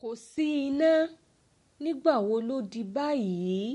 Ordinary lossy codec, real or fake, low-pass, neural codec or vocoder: MP3, 48 kbps; real; 14.4 kHz; none